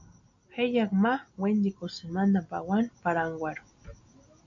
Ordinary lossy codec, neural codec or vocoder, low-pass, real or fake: AAC, 48 kbps; none; 7.2 kHz; real